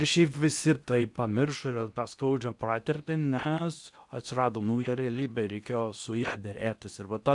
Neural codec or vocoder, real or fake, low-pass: codec, 16 kHz in and 24 kHz out, 0.8 kbps, FocalCodec, streaming, 65536 codes; fake; 10.8 kHz